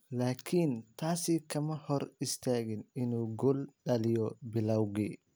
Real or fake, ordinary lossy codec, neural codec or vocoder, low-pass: real; none; none; none